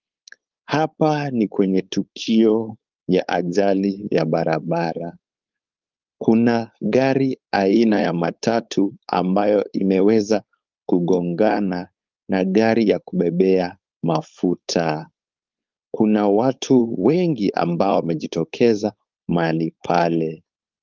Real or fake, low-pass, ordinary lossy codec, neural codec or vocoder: fake; 7.2 kHz; Opus, 32 kbps; codec, 16 kHz, 4.8 kbps, FACodec